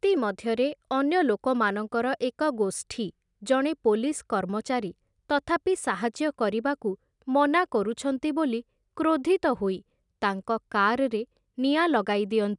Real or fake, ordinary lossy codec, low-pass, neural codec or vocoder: real; none; 10.8 kHz; none